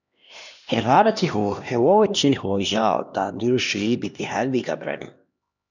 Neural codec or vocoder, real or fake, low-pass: codec, 16 kHz, 1 kbps, X-Codec, HuBERT features, trained on LibriSpeech; fake; 7.2 kHz